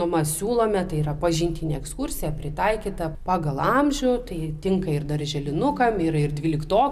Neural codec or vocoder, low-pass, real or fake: none; 14.4 kHz; real